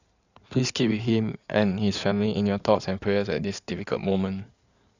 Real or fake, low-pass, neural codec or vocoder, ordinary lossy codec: fake; 7.2 kHz; codec, 16 kHz in and 24 kHz out, 2.2 kbps, FireRedTTS-2 codec; none